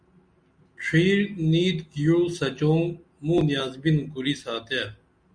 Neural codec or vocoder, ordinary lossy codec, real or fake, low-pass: none; Opus, 64 kbps; real; 9.9 kHz